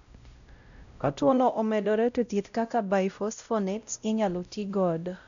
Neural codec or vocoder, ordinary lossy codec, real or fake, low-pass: codec, 16 kHz, 0.5 kbps, X-Codec, WavLM features, trained on Multilingual LibriSpeech; none; fake; 7.2 kHz